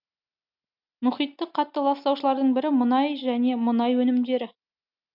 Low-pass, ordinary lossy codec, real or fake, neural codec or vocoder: 5.4 kHz; none; real; none